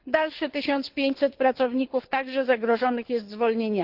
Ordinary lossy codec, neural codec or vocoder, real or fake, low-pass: Opus, 16 kbps; codec, 24 kHz, 6 kbps, HILCodec; fake; 5.4 kHz